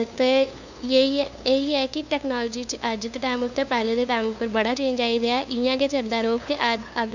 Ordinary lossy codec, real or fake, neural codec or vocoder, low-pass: none; fake; codec, 16 kHz, 2 kbps, FunCodec, trained on LibriTTS, 25 frames a second; 7.2 kHz